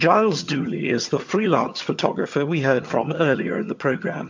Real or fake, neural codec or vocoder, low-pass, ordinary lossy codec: fake; vocoder, 22.05 kHz, 80 mel bands, HiFi-GAN; 7.2 kHz; MP3, 48 kbps